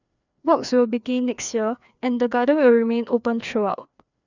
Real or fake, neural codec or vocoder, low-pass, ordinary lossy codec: fake; codec, 16 kHz, 2 kbps, FreqCodec, larger model; 7.2 kHz; none